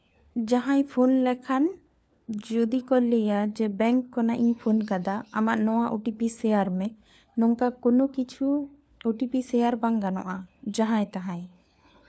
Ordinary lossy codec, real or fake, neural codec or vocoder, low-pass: none; fake; codec, 16 kHz, 4 kbps, FunCodec, trained on LibriTTS, 50 frames a second; none